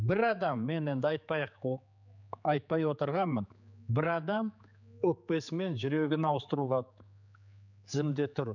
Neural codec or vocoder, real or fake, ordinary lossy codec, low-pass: codec, 16 kHz, 4 kbps, X-Codec, HuBERT features, trained on general audio; fake; none; 7.2 kHz